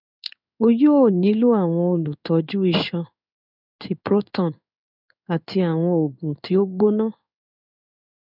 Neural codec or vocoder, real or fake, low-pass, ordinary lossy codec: codec, 16 kHz in and 24 kHz out, 1 kbps, XY-Tokenizer; fake; 5.4 kHz; none